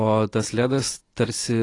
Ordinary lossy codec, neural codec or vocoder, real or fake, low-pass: AAC, 32 kbps; none; real; 10.8 kHz